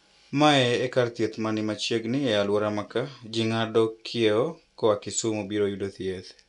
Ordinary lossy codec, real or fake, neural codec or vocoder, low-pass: none; real; none; 10.8 kHz